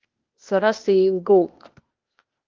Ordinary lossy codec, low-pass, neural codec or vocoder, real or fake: Opus, 24 kbps; 7.2 kHz; codec, 16 kHz, 0.8 kbps, ZipCodec; fake